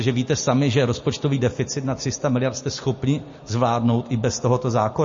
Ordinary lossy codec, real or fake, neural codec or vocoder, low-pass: MP3, 32 kbps; real; none; 7.2 kHz